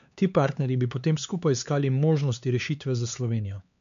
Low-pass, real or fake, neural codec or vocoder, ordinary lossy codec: 7.2 kHz; fake; codec, 16 kHz, 4 kbps, X-Codec, HuBERT features, trained on LibriSpeech; MP3, 64 kbps